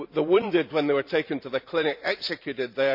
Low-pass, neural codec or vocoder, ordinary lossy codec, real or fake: 5.4 kHz; vocoder, 44.1 kHz, 128 mel bands every 512 samples, BigVGAN v2; none; fake